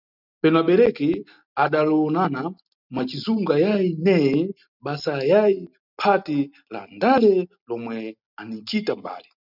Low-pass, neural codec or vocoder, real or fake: 5.4 kHz; none; real